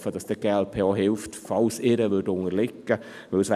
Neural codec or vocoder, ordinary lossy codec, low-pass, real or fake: none; none; 14.4 kHz; real